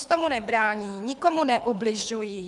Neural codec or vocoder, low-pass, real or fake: codec, 24 kHz, 3 kbps, HILCodec; 10.8 kHz; fake